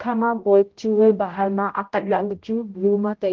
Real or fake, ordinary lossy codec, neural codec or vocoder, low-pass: fake; Opus, 16 kbps; codec, 16 kHz, 0.5 kbps, X-Codec, HuBERT features, trained on general audio; 7.2 kHz